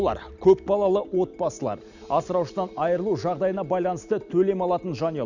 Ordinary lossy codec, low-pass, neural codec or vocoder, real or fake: none; 7.2 kHz; none; real